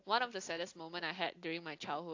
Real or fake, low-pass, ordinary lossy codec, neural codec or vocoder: real; 7.2 kHz; AAC, 48 kbps; none